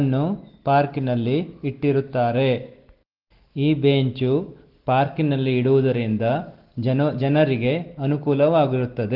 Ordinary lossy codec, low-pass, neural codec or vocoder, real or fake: Opus, 16 kbps; 5.4 kHz; none; real